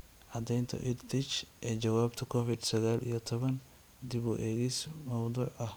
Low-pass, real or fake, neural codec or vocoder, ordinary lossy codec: none; fake; vocoder, 44.1 kHz, 128 mel bands every 512 samples, BigVGAN v2; none